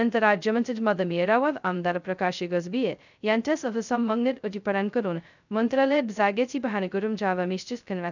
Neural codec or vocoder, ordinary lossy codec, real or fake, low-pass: codec, 16 kHz, 0.2 kbps, FocalCodec; none; fake; 7.2 kHz